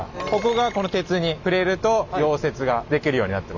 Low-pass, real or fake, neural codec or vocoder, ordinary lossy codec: 7.2 kHz; real; none; none